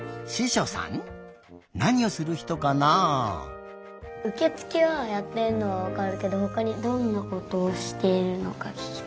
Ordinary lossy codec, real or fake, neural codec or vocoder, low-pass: none; real; none; none